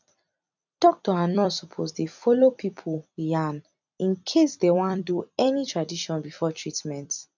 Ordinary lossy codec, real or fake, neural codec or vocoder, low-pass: none; fake; vocoder, 44.1 kHz, 128 mel bands every 256 samples, BigVGAN v2; 7.2 kHz